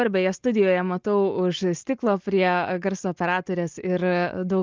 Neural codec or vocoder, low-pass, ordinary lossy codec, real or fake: none; 7.2 kHz; Opus, 32 kbps; real